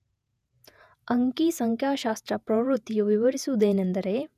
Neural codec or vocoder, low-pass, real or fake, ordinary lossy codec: vocoder, 48 kHz, 128 mel bands, Vocos; 14.4 kHz; fake; none